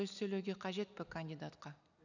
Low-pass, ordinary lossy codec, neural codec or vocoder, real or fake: 7.2 kHz; none; none; real